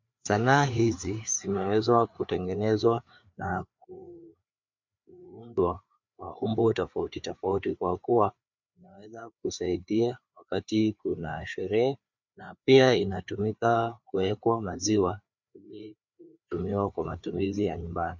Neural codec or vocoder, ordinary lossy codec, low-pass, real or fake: codec, 16 kHz, 4 kbps, FreqCodec, larger model; MP3, 64 kbps; 7.2 kHz; fake